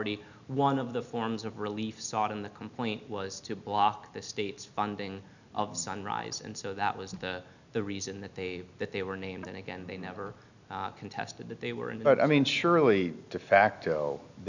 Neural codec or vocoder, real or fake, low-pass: none; real; 7.2 kHz